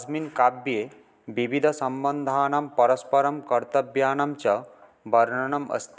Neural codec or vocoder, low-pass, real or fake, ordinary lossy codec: none; none; real; none